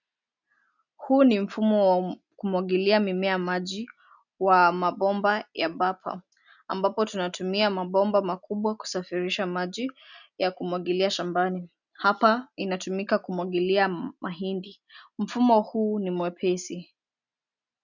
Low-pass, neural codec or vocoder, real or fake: 7.2 kHz; none; real